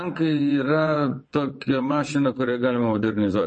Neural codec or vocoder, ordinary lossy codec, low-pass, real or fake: vocoder, 22.05 kHz, 80 mel bands, WaveNeXt; MP3, 32 kbps; 9.9 kHz; fake